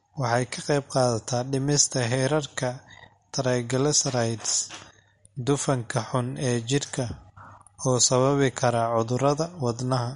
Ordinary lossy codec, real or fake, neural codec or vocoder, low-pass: MP3, 48 kbps; real; none; 19.8 kHz